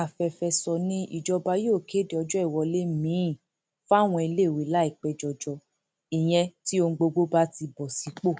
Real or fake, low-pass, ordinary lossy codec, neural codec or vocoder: real; none; none; none